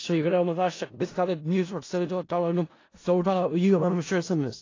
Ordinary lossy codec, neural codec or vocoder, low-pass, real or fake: AAC, 32 kbps; codec, 16 kHz in and 24 kHz out, 0.4 kbps, LongCat-Audio-Codec, four codebook decoder; 7.2 kHz; fake